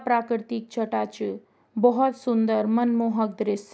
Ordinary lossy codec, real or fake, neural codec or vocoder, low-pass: none; real; none; none